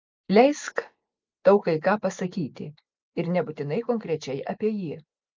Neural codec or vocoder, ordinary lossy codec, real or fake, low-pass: none; Opus, 24 kbps; real; 7.2 kHz